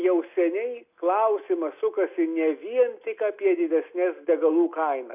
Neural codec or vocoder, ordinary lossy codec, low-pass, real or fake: none; AAC, 32 kbps; 3.6 kHz; real